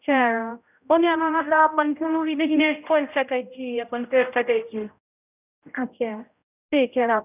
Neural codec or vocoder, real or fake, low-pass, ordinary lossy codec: codec, 16 kHz, 0.5 kbps, X-Codec, HuBERT features, trained on general audio; fake; 3.6 kHz; none